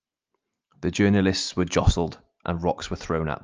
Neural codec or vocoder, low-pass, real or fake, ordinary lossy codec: none; 7.2 kHz; real; Opus, 24 kbps